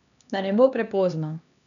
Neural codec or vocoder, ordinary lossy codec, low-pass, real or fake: codec, 16 kHz, 2 kbps, X-Codec, HuBERT features, trained on LibriSpeech; none; 7.2 kHz; fake